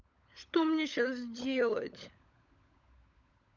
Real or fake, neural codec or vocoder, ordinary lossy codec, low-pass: fake; codec, 16 kHz, 16 kbps, FunCodec, trained on LibriTTS, 50 frames a second; none; 7.2 kHz